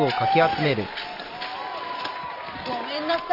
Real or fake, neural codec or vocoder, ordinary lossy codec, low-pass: real; none; none; 5.4 kHz